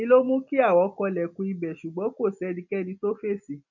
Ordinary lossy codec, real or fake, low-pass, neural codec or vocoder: none; real; 7.2 kHz; none